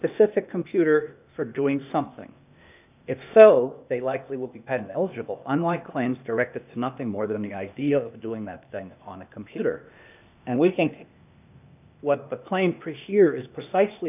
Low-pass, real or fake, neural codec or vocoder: 3.6 kHz; fake; codec, 16 kHz, 0.8 kbps, ZipCodec